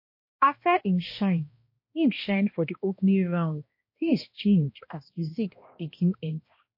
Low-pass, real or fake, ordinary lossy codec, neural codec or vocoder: 5.4 kHz; fake; MP3, 32 kbps; codec, 16 kHz, 1 kbps, X-Codec, HuBERT features, trained on balanced general audio